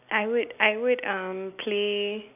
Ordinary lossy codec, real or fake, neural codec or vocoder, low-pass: none; real; none; 3.6 kHz